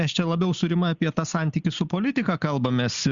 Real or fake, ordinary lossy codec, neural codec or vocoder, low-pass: real; Opus, 64 kbps; none; 7.2 kHz